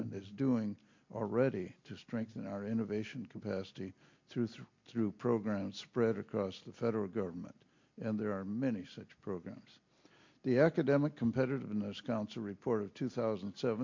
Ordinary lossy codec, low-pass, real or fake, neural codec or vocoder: MP3, 48 kbps; 7.2 kHz; real; none